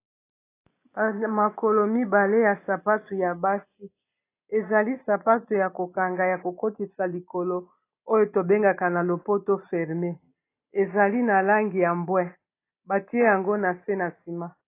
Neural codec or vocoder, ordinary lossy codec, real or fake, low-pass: none; AAC, 24 kbps; real; 3.6 kHz